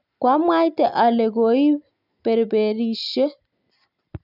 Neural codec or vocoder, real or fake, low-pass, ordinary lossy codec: none; real; 5.4 kHz; none